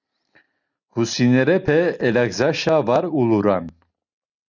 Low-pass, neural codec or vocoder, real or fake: 7.2 kHz; none; real